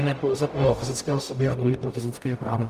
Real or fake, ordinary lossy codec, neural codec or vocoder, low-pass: fake; Opus, 32 kbps; codec, 44.1 kHz, 0.9 kbps, DAC; 14.4 kHz